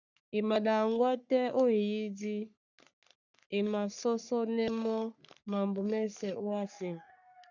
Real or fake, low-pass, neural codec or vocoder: fake; 7.2 kHz; codec, 44.1 kHz, 3.4 kbps, Pupu-Codec